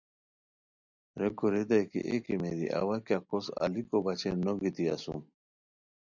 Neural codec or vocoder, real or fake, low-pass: none; real; 7.2 kHz